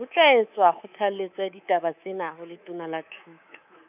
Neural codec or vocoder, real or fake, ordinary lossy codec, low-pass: codec, 24 kHz, 3.1 kbps, DualCodec; fake; none; 3.6 kHz